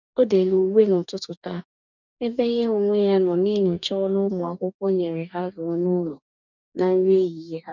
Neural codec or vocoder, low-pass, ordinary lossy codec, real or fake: codec, 44.1 kHz, 2.6 kbps, DAC; 7.2 kHz; none; fake